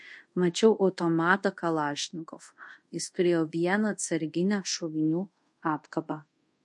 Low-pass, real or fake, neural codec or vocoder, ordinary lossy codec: 10.8 kHz; fake; codec, 24 kHz, 0.5 kbps, DualCodec; MP3, 48 kbps